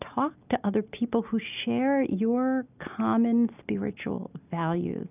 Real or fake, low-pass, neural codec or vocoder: real; 3.6 kHz; none